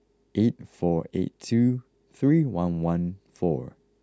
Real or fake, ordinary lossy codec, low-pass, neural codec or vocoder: real; none; none; none